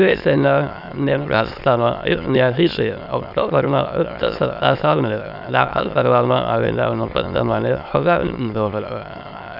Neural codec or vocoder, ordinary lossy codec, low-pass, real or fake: autoencoder, 22.05 kHz, a latent of 192 numbers a frame, VITS, trained on many speakers; none; 5.4 kHz; fake